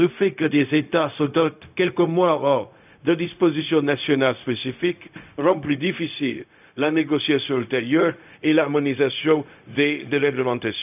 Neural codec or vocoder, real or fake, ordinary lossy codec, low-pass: codec, 16 kHz, 0.4 kbps, LongCat-Audio-Codec; fake; none; 3.6 kHz